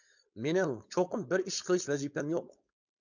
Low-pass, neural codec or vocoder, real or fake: 7.2 kHz; codec, 16 kHz, 4.8 kbps, FACodec; fake